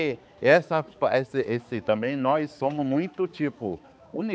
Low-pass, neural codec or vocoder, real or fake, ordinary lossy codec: none; codec, 16 kHz, 4 kbps, X-Codec, HuBERT features, trained on balanced general audio; fake; none